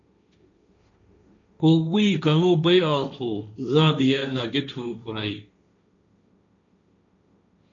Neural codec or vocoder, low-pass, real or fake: codec, 16 kHz, 1.1 kbps, Voila-Tokenizer; 7.2 kHz; fake